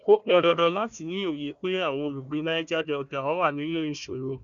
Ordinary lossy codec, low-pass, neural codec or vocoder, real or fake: none; 7.2 kHz; codec, 16 kHz, 1 kbps, FunCodec, trained on Chinese and English, 50 frames a second; fake